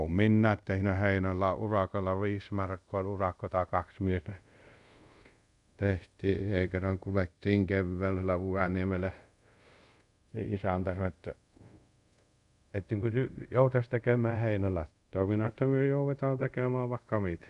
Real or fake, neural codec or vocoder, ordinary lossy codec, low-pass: fake; codec, 24 kHz, 0.5 kbps, DualCodec; none; 10.8 kHz